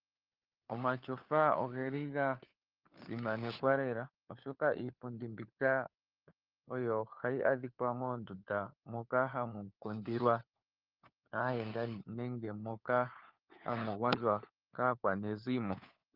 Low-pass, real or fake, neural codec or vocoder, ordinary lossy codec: 5.4 kHz; fake; codec, 16 kHz, 4 kbps, FunCodec, trained on LibriTTS, 50 frames a second; Opus, 32 kbps